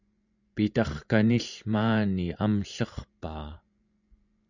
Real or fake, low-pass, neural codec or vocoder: fake; 7.2 kHz; vocoder, 24 kHz, 100 mel bands, Vocos